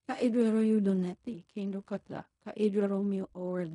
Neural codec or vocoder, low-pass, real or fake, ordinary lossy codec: codec, 16 kHz in and 24 kHz out, 0.4 kbps, LongCat-Audio-Codec, fine tuned four codebook decoder; 10.8 kHz; fake; none